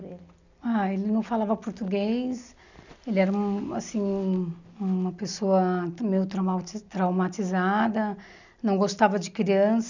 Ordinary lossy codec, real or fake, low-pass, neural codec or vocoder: none; real; 7.2 kHz; none